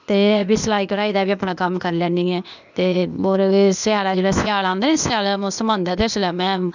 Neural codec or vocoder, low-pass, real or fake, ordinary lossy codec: codec, 16 kHz, 0.8 kbps, ZipCodec; 7.2 kHz; fake; none